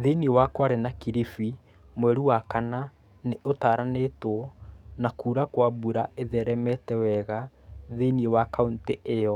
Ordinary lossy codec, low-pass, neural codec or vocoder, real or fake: none; 19.8 kHz; codec, 44.1 kHz, 7.8 kbps, DAC; fake